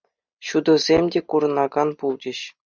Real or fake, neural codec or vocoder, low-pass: real; none; 7.2 kHz